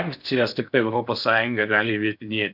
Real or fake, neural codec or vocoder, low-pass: fake; codec, 16 kHz in and 24 kHz out, 0.6 kbps, FocalCodec, streaming, 4096 codes; 5.4 kHz